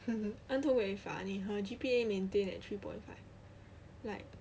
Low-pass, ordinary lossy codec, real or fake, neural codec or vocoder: none; none; real; none